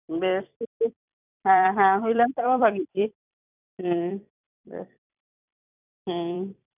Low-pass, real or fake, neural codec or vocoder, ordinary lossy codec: 3.6 kHz; fake; vocoder, 44.1 kHz, 128 mel bands every 256 samples, BigVGAN v2; none